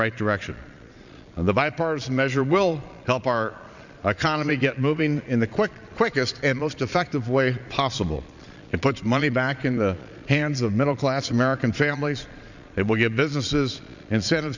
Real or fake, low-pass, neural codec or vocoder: fake; 7.2 kHz; vocoder, 22.05 kHz, 80 mel bands, Vocos